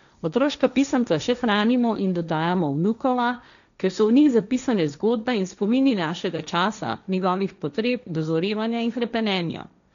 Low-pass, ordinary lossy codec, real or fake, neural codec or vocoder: 7.2 kHz; none; fake; codec, 16 kHz, 1.1 kbps, Voila-Tokenizer